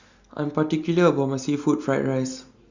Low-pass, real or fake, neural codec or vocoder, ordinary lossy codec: 7.2 kHz; real; none; Opus, 64 kbps